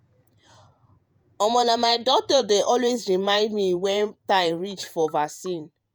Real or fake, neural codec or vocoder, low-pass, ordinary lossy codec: fake; vocoder, 48 kHz, 128 mel bands, Vocos; none; none